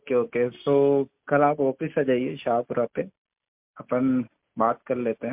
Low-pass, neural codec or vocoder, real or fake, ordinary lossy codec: 3.6 kHz; none; real; MP3, 32 kbps